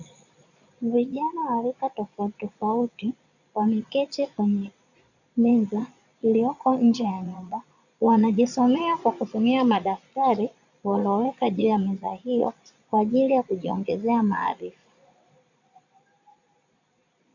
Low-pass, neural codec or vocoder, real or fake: 7.2 kHz; vocoder, 22.05 kHz, 80 mel bands, Vocos; fake